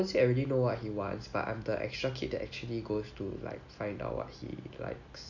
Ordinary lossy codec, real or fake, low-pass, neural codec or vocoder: none; real; 7.2 kHz; none